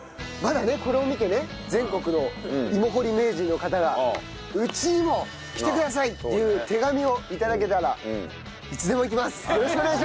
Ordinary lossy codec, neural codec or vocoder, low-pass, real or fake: none; none; none; real